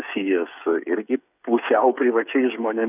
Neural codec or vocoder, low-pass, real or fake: codec, 16 kHz, 8 kbps, FreqCodec, smaller model; 3.6 kHz; fake